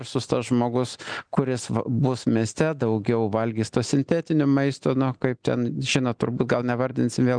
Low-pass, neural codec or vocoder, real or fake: 9.9 kHz; none; real